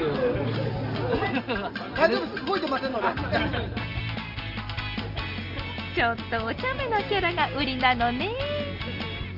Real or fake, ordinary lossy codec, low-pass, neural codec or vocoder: real; Opus, 32 kbps; 5.4 kHz; none